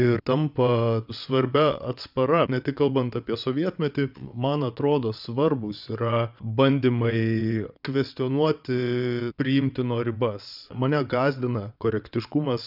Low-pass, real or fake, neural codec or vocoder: 5.4 kHz; fake; vocoder, 22.05 kHz, 80 mel bands, WaveNeXt